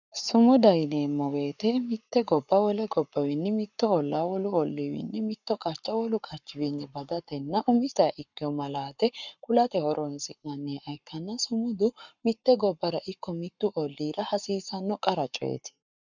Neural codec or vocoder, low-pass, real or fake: codec, 44.1 kHz, 7.8 kbps, Pupu-Codec; 7.2 kHz; fake